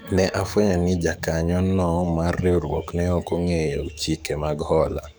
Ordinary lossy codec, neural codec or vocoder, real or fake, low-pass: none; codec, 44.1 kHz, 7.8 kbps, DAC; fake; none